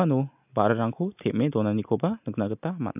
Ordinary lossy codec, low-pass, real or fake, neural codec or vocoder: AAC, 32 kbps; 3.6 kHz; real; none